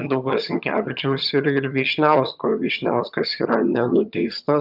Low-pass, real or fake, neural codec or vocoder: 5.4 kHz; fake; vocoder, 22.05 kHz, 80 mel bands, HiFi-GAN